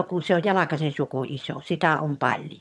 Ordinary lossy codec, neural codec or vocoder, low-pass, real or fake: none; vocoder, 22.05 kHz, 80 mel bands, HiFi-GAN; none; fake